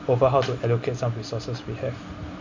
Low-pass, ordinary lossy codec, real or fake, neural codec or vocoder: 7.2 kHz; MP3, 64 kbps; real; none